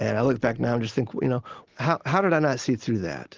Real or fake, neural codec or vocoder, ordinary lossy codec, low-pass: real; none; Opus, 16 kbps; 7.2 kHz